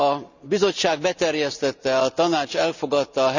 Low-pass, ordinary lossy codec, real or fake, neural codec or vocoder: 7.2 kHz; none; real; none